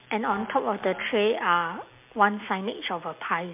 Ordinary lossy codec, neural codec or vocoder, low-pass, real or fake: MP3, 32 kbps; none; 3.6 kHz; real